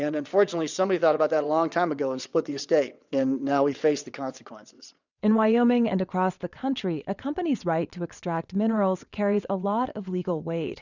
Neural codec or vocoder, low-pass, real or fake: vocoder, 22.05 kHz, 80 mel bands, WaveNeXt; 7.2 kHz; fake